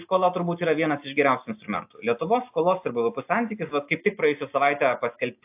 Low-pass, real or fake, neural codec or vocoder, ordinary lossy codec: 3.6 kHz; real; none; AAC, 32 kbps